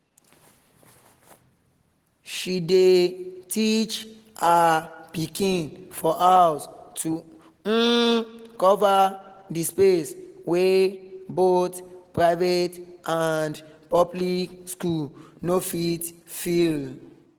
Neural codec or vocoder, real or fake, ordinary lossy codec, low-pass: none; real; Opus, 16 kbps; 19.8 kHz